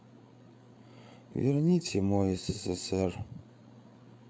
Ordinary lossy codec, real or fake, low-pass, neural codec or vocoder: none; fake; none; codec, 16 kHz, 8 kbps, FreqCodec, larger model